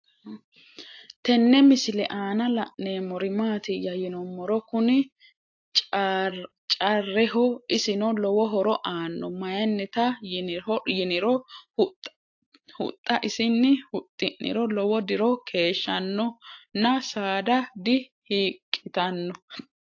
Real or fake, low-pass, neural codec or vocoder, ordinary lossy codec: real; 7.2 kHz; none; AAC, 48 kbps